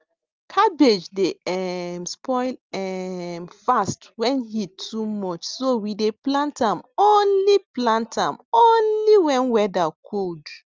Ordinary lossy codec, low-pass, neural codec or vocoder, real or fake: Opus, 32 kbps; 7.2 kHz; none; real